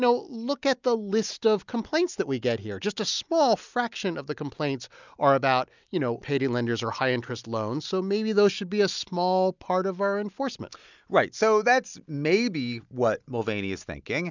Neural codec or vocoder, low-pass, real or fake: none; 7.2 kHz; real